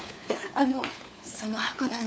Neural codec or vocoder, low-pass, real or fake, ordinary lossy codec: codec, 16 kHz, 4 kbps, FunCodec, trained on LibriTTS, 50 frames a second; none; fake; none